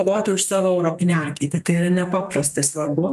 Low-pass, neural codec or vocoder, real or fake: 14.4 kHz; codec, 32 kHz, 1.9 kbps, SNAC; fake